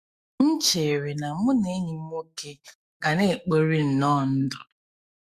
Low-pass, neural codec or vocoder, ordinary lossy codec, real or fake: 14.4 kHz; autoencoder, 48 kHz, 128 numbers a frame, DAC-VAE, trained on Japanese speech; Opus, 64 kbps; fake